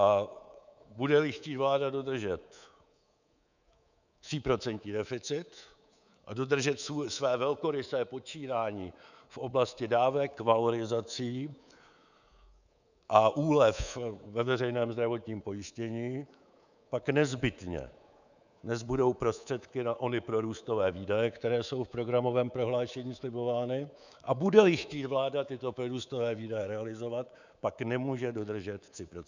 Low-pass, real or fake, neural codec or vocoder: 7.2 kHz; fake; codec, 24 kHz, 3.1 kbps, DualCodec